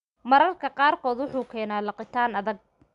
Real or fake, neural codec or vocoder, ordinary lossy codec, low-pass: real; none; none; 10.8 kHz